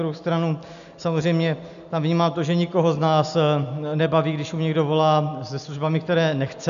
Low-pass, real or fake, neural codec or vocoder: 7.2 kHz; real; none